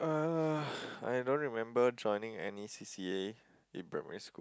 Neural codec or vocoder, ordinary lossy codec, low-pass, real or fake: none; none; none; real